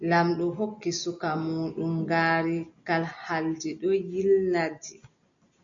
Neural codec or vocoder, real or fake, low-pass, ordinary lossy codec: none; real; 7.2 kHz; MP3, 64 kbps